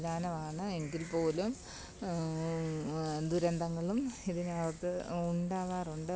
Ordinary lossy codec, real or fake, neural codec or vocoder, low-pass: none; real; none; none